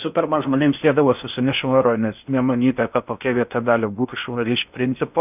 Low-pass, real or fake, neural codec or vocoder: 3.6 kHz; fake; codec, 16 kHz in and 24 kHz out, 0.6 kbps, FocalCodec, streaming, 4096 codes